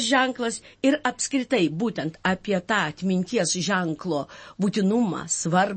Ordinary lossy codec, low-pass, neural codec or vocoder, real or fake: MP3, 32 kbps; 9.9 kHz; none; real